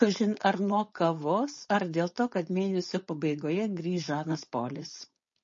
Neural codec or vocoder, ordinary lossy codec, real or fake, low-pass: codec, 16 kHz, 4.8 kbps, FACodec; MP3, 32 kbps; fake; 7.2 kHz